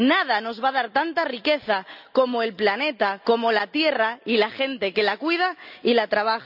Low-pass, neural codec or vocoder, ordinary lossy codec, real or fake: 5.4 kHz; none; none; real